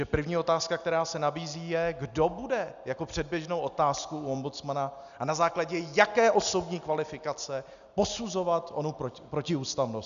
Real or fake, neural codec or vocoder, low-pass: real; none; 7.2 kHz